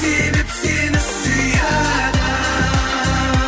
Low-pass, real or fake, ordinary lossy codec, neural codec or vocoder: none; real; none; none